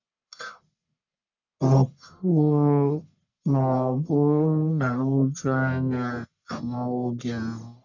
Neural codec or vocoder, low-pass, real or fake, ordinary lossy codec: codec, 44.1 kHz, 1.7 kbps, Pupu-Codec; 7.2 kHz; fake; none